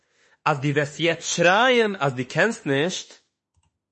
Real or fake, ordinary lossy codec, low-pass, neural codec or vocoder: fake; MP3, 32 kbps; 10.8 kHz; autoencoder, 48 kHz, 32 numbers a frame, DAC-VAE, trained on Japanese speech